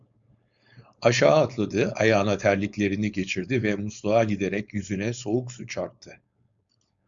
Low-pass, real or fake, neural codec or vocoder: 7.2 kHz; fake; codec, 16 kHz, 4.8 kbps, FACodec